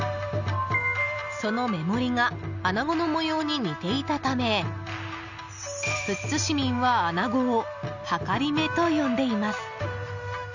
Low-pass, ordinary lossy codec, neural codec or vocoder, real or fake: 7.2 kHz; none; none; real